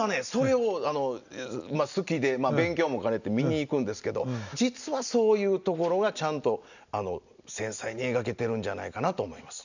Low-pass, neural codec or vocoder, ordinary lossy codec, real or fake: 7.2 kHz; none; none; real